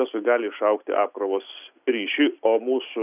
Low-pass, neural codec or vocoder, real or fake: 3.6 kHz; none; real